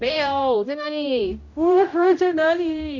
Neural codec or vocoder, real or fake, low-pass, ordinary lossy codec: codec, 16 kHz, 0.5 kbps, X-Codec, HuBERT features, trained on general audio; fake; 7.2 kHz; none